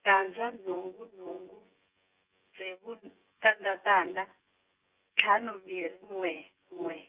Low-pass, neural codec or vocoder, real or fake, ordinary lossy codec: 3.6 kHz; vocoder, 24 kHz, 100 mel bands, Vocos; fake; Opus, 24 kbps